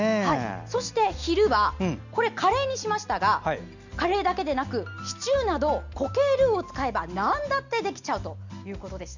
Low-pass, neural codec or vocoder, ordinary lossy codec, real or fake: 7.2 kHz; none; none; real